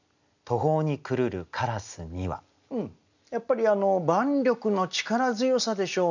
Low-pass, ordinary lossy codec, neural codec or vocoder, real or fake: 7.2 kHz; none; none; real